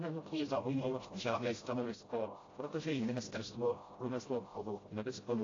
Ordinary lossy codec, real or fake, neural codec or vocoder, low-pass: AAC, 32 kbps; fake; codec, 16 kHz, 0.5 kbps, FreqCodec, smaller model; 7.2 kHz